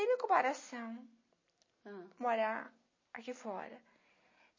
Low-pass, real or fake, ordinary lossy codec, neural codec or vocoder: 7.2 kHz; real; MP3, 32 kbps; none